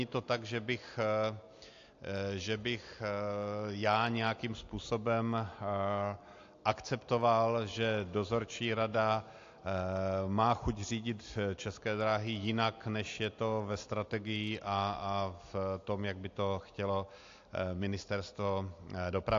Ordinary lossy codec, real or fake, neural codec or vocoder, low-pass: AAC, 48 kbps; real; none; 7.2 kHz